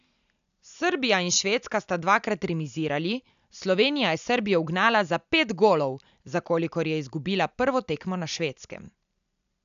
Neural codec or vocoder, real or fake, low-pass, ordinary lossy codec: none; real; 7.2 kHz; none